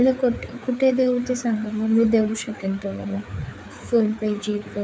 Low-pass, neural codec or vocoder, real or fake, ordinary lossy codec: none; codec, 16 kHz, 4 kbps, FreqCodec, larger model; fake; none